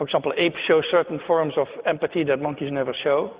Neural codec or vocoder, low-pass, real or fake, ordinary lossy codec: none; 3.6 kHz; real; Opus, 64 kbps